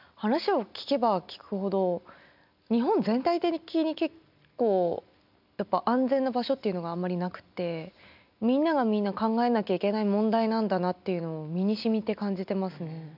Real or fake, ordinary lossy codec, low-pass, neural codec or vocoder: real; none; 5.4 kHz; none